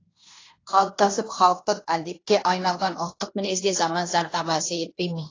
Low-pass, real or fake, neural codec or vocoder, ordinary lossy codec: 7.2 kHz; fake; codec, 16 kHz in and 24 kHz out, 0.9 kbps, LongCat-Audio-Codec, fine tuned four codebook decoder; AAC, 32 kbps